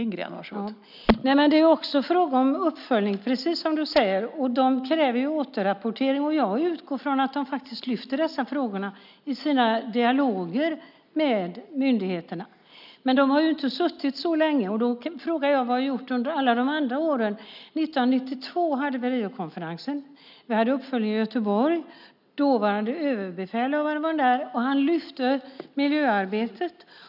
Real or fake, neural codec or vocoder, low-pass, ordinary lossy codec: real; none; 5.4 kHz; none